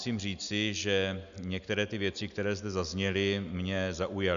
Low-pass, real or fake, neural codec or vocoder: 7.2 kHz; real; none